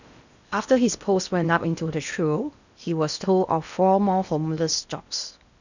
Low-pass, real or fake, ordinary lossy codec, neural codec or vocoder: 7.2 kHz; fake; none; codec, 16 kHz in and 24 kHz out, 0.8 kbps, FocalCodec, streaming, 65536 codes